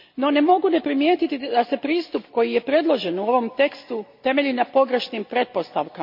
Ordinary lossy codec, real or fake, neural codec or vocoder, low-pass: MP3, 48 kbps; real; none; 5.4 kHz